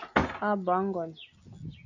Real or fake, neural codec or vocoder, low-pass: real; none; 7.2 kHz